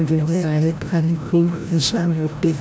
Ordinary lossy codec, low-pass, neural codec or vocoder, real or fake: none; none; codec, 16 kHz, 0.5 kbps, FreqCodec, larger model; fake